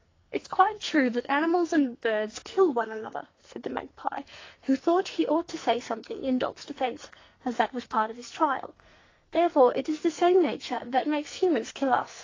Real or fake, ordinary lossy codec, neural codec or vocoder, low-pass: fake; AAC, 32 kbps; codec, 44.1 kHz, 2.6 kbps, SNAC; 7.2 kHz